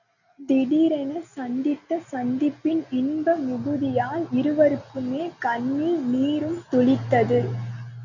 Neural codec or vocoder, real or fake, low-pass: none; real; 7.2 kHz